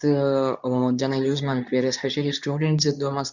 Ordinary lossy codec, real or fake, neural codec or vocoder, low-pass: none; fake; codec, 24 kHz, 0.9 kbps, WavTokenizer, medium speech release version 2; 7.2 kHz